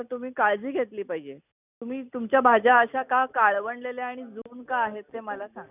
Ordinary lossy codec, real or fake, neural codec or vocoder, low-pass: none; real; none; 3.6 kHz